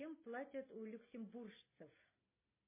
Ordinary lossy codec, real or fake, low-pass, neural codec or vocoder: MP3, 16 kbps; real; 3.6 kHz; none